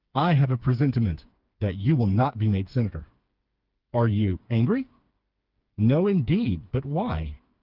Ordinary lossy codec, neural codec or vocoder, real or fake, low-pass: Opus, 32 kbps; codec, 16 kHz, 4 kbps, FreqCodec, smaller model; fake; 5.4 kHz